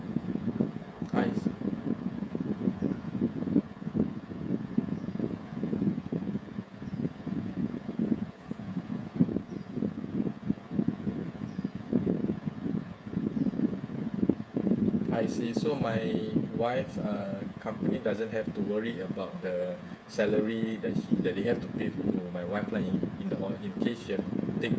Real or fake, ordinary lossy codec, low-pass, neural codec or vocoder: fake; none; none; codec, 16 kHz, 8 kbps, FreqCodec, smaller model